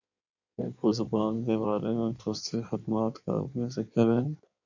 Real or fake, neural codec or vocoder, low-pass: fake; codec, 16 kHz in and 24 kHz out, 1.1 kbps, FireRedTTS-2 codec; 7.2 kHz